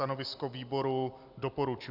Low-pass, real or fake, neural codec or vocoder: 5.4 kHz; real; none